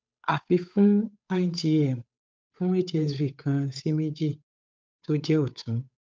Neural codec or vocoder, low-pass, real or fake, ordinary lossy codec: codec, 16 kHz, 8 kbps, FunCodec, trained on Chinese and English, 25 frames a second; none; fake; none